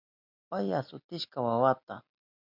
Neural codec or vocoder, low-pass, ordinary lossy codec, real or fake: none; 5.4 kHz; MP3, 48 kbps; real